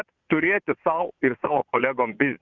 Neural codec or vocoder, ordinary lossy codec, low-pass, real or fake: vocoder, 22.05 kHz, 80 mel bands, Vocos; Opus, 64 kbps; 7.2 kHz; fake